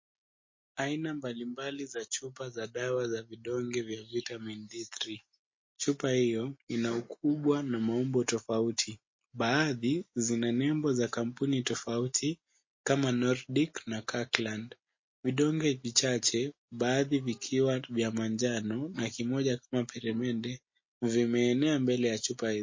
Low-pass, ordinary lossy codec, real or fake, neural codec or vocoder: 7.2 kHz; MP3, 32 kbps; real; none